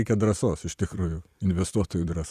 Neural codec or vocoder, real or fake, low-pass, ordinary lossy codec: vocoder, 44.1 kHz, 128 mel bands every 256 samples, BigVGAN v2; fake; 14.4 kHz; Opus, 64 kbps